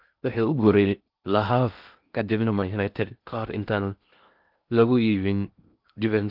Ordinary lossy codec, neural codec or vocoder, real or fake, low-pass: Opus, 32 kbps; codec, 16 kHz in and 24 kHz out, 0.6 kbps, FocalCodec, streaming, 4096 codes; fake; 5.4 kHz